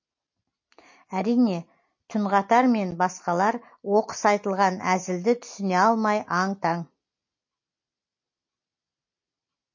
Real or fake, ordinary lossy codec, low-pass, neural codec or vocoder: real; MP3, 32 kbps; 7.2 kHz; none